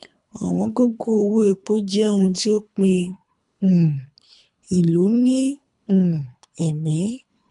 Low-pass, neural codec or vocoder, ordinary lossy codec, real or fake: 10.8 kHz; codec, 24 kHz, 3 kbps, HILCodec; none; fake